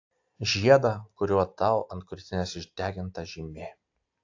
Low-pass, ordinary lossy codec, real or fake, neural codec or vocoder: 7.2 kHz; AAC, 48 kbps; real; none